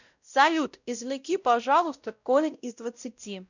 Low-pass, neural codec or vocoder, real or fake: 7.2 kHz; codec, 16 kHz, 0.5 kbps, X-Codec, WavLM features, trained on Multilingual LibriSpeech; fake